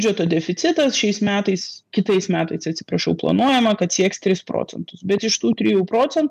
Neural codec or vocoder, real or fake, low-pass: none; real; 14.4 kHz